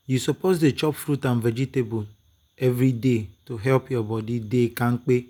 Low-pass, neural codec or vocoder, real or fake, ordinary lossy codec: none; none; real; none